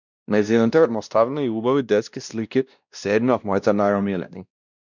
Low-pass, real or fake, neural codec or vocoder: 7.2 kHz; fake; codec, 16 kHz, 1 kbps, X-Codec, WavLM features, trained on Multilingual LibriSpeech